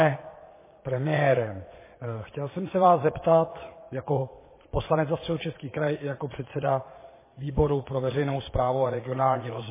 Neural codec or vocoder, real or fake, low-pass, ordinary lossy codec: vocoder, 22.05 kHz, 80 mel bands, Vocos; fake; 3.6 kHz; MP3, 16 kbps